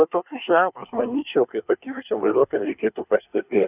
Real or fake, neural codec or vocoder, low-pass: fake; codec, 24 kHz, 1 kbps, SNAC; 3.6 kHz